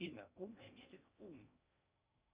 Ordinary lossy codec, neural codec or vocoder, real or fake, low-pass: Opus, 64 kbps; codec, 16 kHz in and 24 kHz out, 0.6 kbps, FocalCodec, streaming, 4096 codes; fake; 3.6 kHz